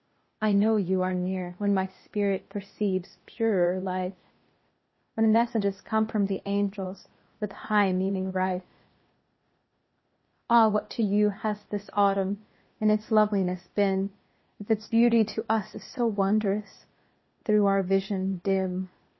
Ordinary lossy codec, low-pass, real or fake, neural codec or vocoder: MP3, 24 kbps; 7.2 kHz; fake; codec, 16 kHz, 0.8 kbps, ZipCodec